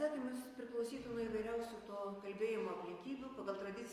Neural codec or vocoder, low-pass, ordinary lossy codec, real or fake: none; 14.4 kHz; Opus, 32 kbps; real